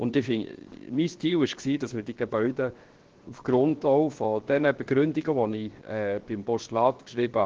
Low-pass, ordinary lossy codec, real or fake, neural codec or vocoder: 7.2 kHz; Opus, 16 kbps; fake; codec, 16 kHz, 0.7 kbps, FocalCodec